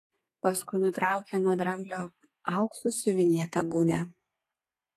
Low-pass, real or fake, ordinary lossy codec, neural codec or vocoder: 14.4 kHz; fake; AAC, 48 kbps; codec, 32 kHz, 1.9 kbps, SNAC